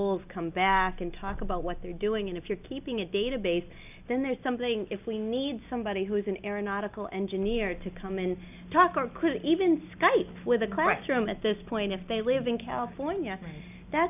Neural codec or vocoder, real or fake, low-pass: none; real; 3.6 kHz